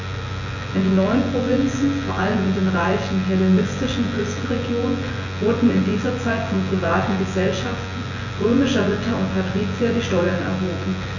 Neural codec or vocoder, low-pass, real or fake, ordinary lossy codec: vocoder, 24 kHz, 100 mel bands, Vocos; 7.2 kHz; fake; none